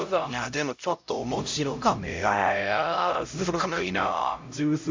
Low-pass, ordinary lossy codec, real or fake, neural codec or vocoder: 7.2 kHz; MP3, 64 kbps; fake; codec, 16 kHz, 0.5 kbps, X-Codec, HuBERT features, trained on LibriSpeech